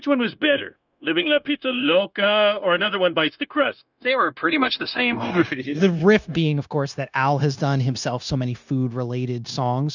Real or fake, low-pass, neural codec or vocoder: fake; 7.2 kHz; codec, 16 kHz, 0.9 kbps, LongCat-Audio-Codec